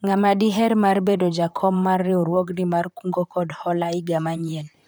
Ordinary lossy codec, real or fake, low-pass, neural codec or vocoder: none; fake; none; vocoder, 44.1 kHz, 128 mel bands, Pupu-Vocoder